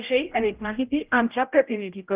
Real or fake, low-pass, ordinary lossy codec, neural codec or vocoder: fake; 3.6 kHz; Opus, 32 kbps; codec, 16 kHz, 0.5 kbps, X-Codec, HuBERT features, trained on general audio